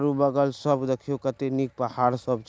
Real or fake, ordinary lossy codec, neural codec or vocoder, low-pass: real; none; none; none